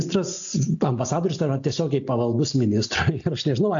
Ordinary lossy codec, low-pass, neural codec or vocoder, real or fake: AAC, 64 kbps; 7.2 kHz; none; real